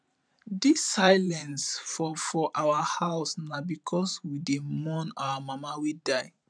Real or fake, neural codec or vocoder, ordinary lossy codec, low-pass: fake; vocoder, 44.1 kHz, 128 mel bands every 512 samples, BigVGAN v2; none; 9.9 kHz